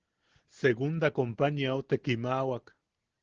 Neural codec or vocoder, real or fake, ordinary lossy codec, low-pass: none; real; Opus, 16 kbps; 7.2 kHz